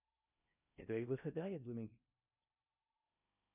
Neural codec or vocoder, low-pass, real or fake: codec, 16 kHz in and 24 kHz out, 0.8 kbps, FocalCodec, streaming, 65536 codes; 3.6 kHz; fake